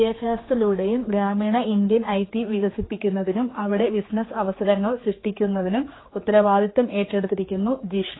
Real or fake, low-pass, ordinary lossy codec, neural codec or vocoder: fake; 7.2 kHz; AAC, 16 kbps; codec, 16 kHz, 4 kbps, X-Codec, HuBERT features, trained on general audio